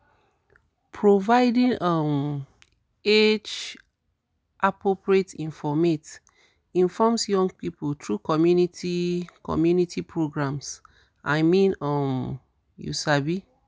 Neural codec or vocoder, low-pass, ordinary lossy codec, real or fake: none; none; none; real